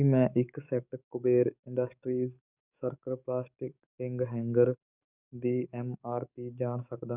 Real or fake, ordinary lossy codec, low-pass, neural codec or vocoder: fake; none; 3.6 kHz; codec, 44.1 kHz, 7.8 kbps, DAC